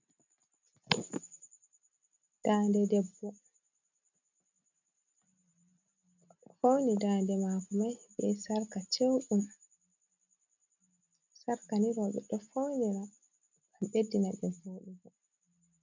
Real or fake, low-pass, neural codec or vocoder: real; 7.2 kHz; none